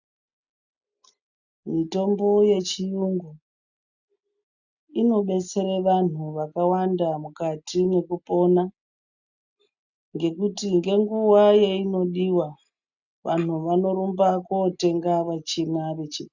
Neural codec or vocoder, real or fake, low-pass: none; real; 7.2 kHz